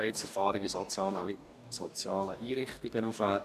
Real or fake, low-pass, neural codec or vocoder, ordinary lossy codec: fake; 14.4 kHz; codec, 44.1 kHz, 2.6 kbps, DAC; none